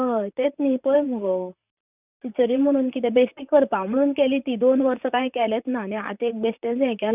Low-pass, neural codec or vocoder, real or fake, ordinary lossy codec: 3.6 kHz; vocoder, 44.1 kHz, 128 mel bands, Pupu-Vocoder; fake; none